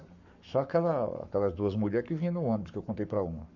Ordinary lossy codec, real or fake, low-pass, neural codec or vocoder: none; fake; 7.2 kHz; codec, 44.1 kHz, 7.8 kbps, Pupu-Codec